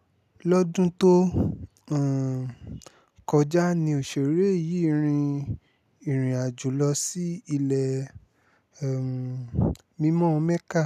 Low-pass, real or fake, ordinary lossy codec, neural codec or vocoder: 14.4 kHz; real; none; none